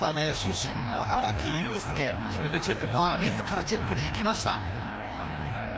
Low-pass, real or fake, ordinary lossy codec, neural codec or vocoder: none; fake; none; codec, 16 kHz, 1 kbps, FreqCodec, larger model